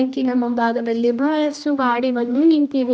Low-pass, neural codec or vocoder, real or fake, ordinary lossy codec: none; codec, 16 kHz, 1 kbps, X-Codec, HuBERT features, trained on general audio; fake; none